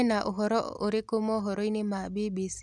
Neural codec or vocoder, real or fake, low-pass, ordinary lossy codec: none; real; none; none